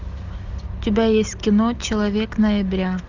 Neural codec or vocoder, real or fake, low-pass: none; real; 7.2 kHz